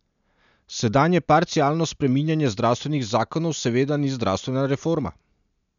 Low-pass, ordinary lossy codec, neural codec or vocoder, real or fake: 7.2 kHz; none; none; real